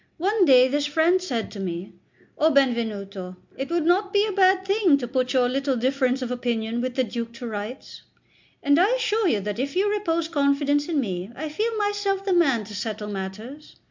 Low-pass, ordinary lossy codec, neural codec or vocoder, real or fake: 7.2 kHz; MP3, 64 kbps; none; real